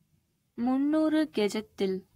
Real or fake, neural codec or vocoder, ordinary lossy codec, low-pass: fake; codec, 44.1 kHz, 7.8 kbps, Pupu-Codec; AAC, 48 kbps; 19.8 kHz